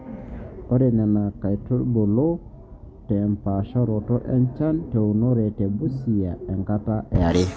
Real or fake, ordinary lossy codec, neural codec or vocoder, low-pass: real; none; none; none